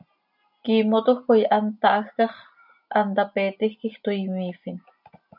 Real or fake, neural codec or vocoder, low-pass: real; none; 5.4 kHz